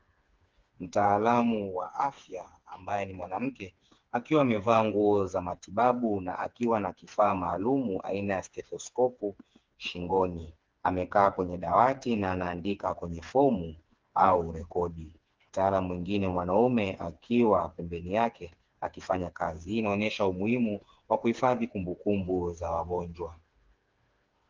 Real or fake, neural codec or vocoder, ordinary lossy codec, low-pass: fake; codec, 16 kHz, 4 kbps, FreqCodec, smaller model; Opus, 32 kbps; 7.2 kHz